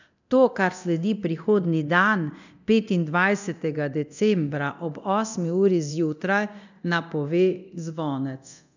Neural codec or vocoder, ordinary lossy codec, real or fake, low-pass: codec, 24 kHz, 0.9 kbps, DualCodec; none; fake; 7.2 kHz